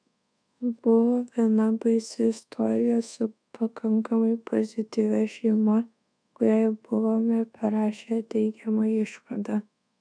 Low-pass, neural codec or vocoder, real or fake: 9.9 kHz; codec, 24 kHz, 1.2 kbps, DualCodec; fake